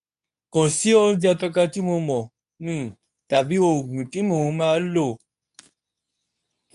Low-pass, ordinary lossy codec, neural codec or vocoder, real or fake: 10.8 kHz; none; codec, 24 kHz, 0.9 kbps, WavTokenizer, medium speech release version 2; fake